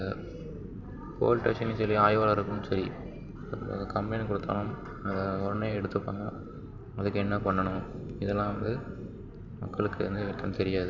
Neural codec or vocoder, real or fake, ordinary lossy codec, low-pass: vocoder, 44.1 kHz, 128 mel bands every 512 samples, BigVGAN v2; fake; none; 7.2 kHz